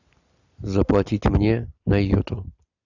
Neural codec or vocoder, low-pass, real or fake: none; 7.2 kHz; real